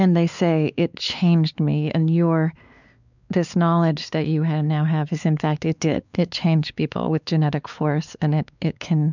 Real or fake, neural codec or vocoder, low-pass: fake; codec, 16 kHz, 4 kbps, X-Codec, WavLM features, trained on Multilingual LibriSpeech; 7.2 kHz